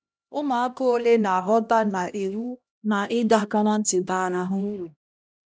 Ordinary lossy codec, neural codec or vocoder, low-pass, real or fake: none; codec, 16 kHz, 1 kbps, X-Codec, HuBERT features, trained on LibriSpeech; none; fake